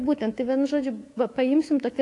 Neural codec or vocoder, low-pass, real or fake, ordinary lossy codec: codec, 24 kHz, 3.1 kbps, DualCodec; 10.8 kHz; fake; AAC, 48 kbps